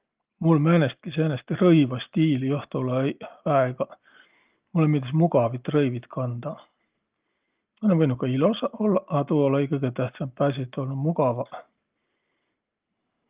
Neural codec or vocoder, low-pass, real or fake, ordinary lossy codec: none; 3.6 kHz; real; Opus, 32 kbps